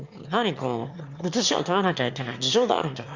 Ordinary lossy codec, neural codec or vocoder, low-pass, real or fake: Opus, 64 kbps; autoencoder, 22.05 kHz, a latent of 192 numbers a frame, VITS, trained on one speaker; 7.2 kHz; fake